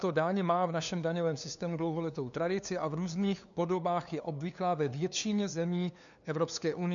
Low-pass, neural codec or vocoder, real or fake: 7.2 kHz; codec, 16 kHz, 2 kbps, FunCodec, trained on LibriTTS, 25 frames a second; fake